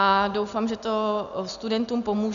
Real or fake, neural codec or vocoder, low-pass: real; none; 7.2 kHz